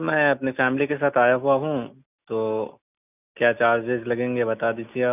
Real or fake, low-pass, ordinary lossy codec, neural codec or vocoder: real; 3.6 kHz; none; none